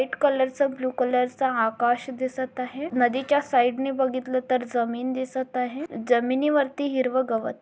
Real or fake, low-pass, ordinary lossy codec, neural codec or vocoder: real; none; none; none